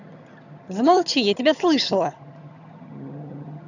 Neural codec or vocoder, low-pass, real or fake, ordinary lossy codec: vocoder, 22.05 kHz, 80 mel bands, HiFi-GAN; 7.2 kHz; fake; none